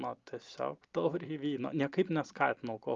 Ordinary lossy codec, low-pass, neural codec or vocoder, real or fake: Opus, 32 kbps; 7.2 kHz; none; real